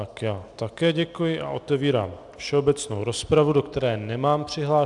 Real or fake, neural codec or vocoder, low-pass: real; none; 10.8 kHz